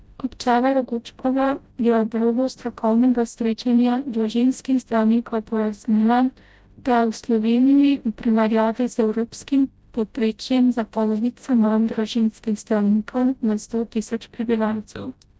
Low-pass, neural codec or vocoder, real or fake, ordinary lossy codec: none; codec, 16 kHz, 0.5 kbps, FreqCodec, smaller model; fake; none